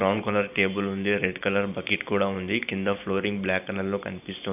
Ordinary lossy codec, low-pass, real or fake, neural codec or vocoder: none; 3.6 kHz; fake; vocoder, 44.1 kHz, 128 mel bands every 512 samples, BigVGAN v2